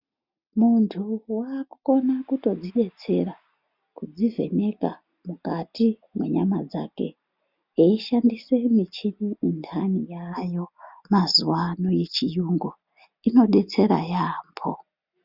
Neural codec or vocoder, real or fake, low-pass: none; real; 5.4 kHz